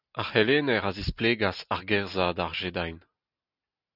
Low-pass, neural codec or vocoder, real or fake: 5.4 kHz; none; real